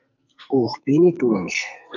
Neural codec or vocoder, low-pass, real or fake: codec, 44.1 kHz, 2.6 kbps, SNAC; 7.2 kHz; fake